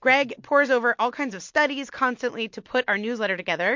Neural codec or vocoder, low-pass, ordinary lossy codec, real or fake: none; 7.2 kHz; MP3, 48 kbps; real